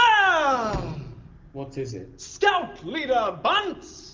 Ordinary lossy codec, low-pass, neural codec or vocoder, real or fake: Opus, 32 kbps; 7.2 kHz; none; real